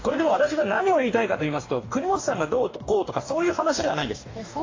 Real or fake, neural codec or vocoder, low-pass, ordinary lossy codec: fake; codec, 44.1 kHz, 2.6 kbps, DAC; 7.2 kHz; AAC, 32 kbps